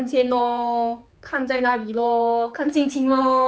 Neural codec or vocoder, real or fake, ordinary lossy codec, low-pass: codec, 16 kHz, 4 kbps, X-Codec, HuBERT features, trained on general audio; fake; none; none